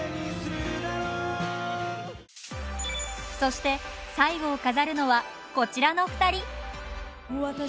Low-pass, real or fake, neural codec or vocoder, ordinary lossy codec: none; real; none; none